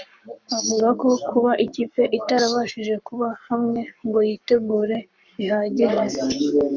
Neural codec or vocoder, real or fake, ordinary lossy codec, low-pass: codec, 44.1 kHz, 7.8 kbps, Pupu-Codec; fake; MP3, 64 kbps; 7.2 kHz